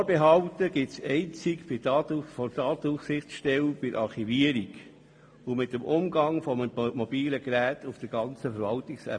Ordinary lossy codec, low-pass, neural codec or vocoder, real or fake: AAC, 64 kbps; 9.9 kHz; none; real